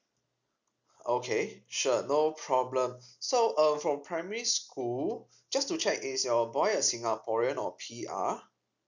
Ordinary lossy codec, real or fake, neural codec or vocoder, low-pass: none; real; none; 7.2 kHz